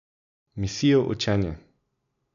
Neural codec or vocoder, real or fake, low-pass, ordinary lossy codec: none; real; 7.2 kHz; none